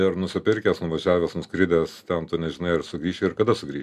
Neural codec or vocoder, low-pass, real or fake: none; 14.4 kHz; real